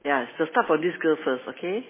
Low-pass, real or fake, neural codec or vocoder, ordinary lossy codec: 3.6 kHz; real; none; MP3, 16 kbps